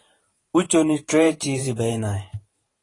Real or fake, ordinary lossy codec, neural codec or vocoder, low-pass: fake; AAC, 32 kbps; vocoder, 44.1 kHz, 128 mel bands every 512 samples, BigVGAN v2; 10.8 kHz